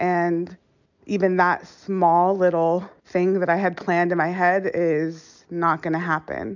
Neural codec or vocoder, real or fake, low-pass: none; real; 7.2 kHz